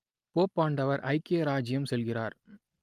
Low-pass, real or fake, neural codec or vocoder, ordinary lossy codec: 14.4 kHz; real; none; Opus, 32 kbps